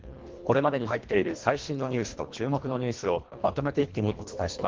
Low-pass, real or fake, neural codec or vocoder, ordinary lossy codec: 7.2 kHz; fake; codec, 24 kHz, 1.5 kbps, HILCodec; Opus, 16 kbps